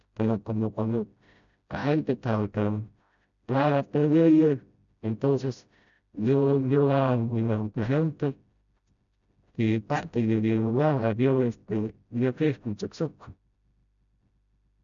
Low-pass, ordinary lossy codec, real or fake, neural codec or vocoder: 7.2 kHz; none; fake; codec, 16 kHz, 0.5 kbps, FreqCodec, smaller model